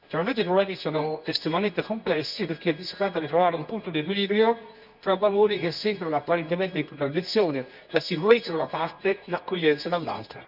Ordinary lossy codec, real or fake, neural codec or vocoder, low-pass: none; fake; codec, 24 kHz, 0.9 kbps, WavTokenizer, medium music audio release; 5.4 kHz